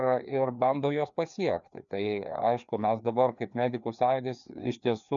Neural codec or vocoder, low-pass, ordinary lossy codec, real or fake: codec, 16 kHz, 2 kbps, FreqCodec, larger model; 7.2 kHz; MP3, 96 kbps; fake